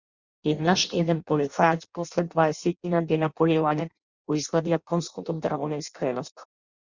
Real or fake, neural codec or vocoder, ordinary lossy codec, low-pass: fake; codec, 16 kHz in and 24 kHz out, 0.6 kbps, FireRedTTS-2 codec; Opus, 64 kbps; 7.2 kHz